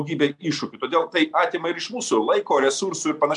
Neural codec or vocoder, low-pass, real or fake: none; 10.8 kHz; real